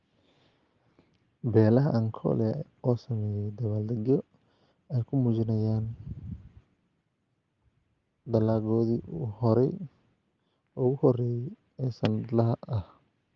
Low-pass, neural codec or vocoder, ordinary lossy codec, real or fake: 7.2 kHz; none; Opus, 32 kbps; real